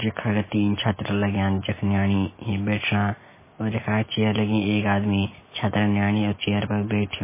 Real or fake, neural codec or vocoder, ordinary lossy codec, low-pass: real; none; MP3, 16 kbps; 3.6 kHz